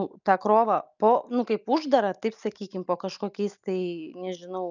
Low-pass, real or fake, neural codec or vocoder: 7.2 kHz; real; none